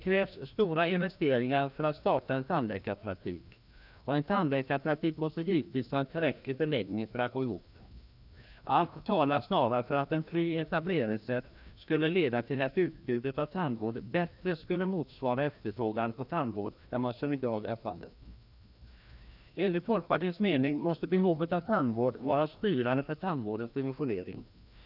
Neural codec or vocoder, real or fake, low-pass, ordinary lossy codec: codec, 16 kHz, 1 kbps, FreqCodec, larger model; fake; 5.4 kHz; none